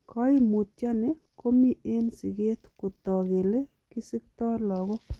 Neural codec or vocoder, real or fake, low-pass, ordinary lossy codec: none; real; 19.8 kHz; Opus, 16 kbps